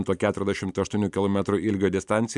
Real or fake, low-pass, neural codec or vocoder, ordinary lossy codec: fake; 10.8 kHz; vocoder, 44.1 kHz, 128 mel bands every 512 samples, BigVGAN v2; MP3, 96 kbps